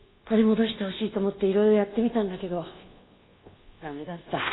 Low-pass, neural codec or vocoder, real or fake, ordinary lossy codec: 7.2 kHz; codec, 24 kHz, 1.2 kbps, DualCodec; fake; AAC, 16 kbps